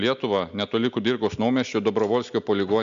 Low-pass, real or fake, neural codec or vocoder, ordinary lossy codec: 7.2 kHz; real; none; MP3, 64 kbps